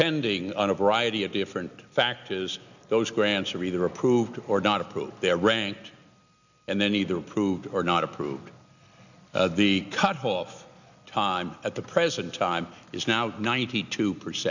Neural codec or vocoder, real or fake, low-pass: none; real; 7.2 kHz